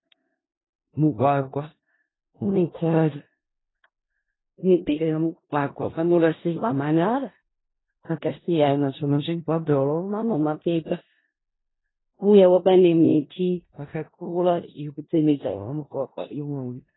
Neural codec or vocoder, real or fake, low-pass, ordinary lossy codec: codec, 16 kHz in and 24 kHz out, 0.4 kbps, LongCat-Audio-Codec, four codebook decoder; fake; 7.2 kHz; AAC, 16 kbps